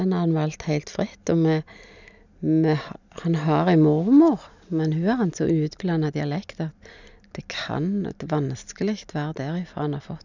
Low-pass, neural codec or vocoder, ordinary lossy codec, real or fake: 7.2 kHz; none; none; real